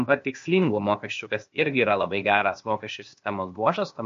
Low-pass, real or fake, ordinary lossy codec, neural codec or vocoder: 7.2 kHz; fake; MP3, 48 kbps; codec, 16 kHz, 0.8 kbps, ZipCodec